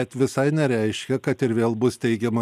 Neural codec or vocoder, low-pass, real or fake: none; 14.4 kHz; real